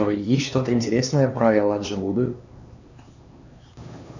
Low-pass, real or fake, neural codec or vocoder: 7.2 kHz; fake; codec, 16 kHz, 2 kbps, X-Codec, HuBERT features, trained on LibriSpeech